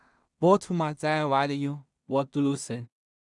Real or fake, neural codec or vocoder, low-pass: fake; codec, 16 kHz in and 24 kHz out, 0.4 kbps, LongCat-Audio-Codec, two codebook decoder; 10.8 kHz